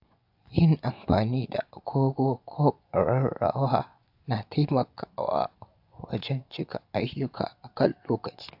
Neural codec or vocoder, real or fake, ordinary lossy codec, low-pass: vocoder, 24 kHz, 100 mel bands, Vocos; fake; AAC, 48 kbps; 5.4 kHz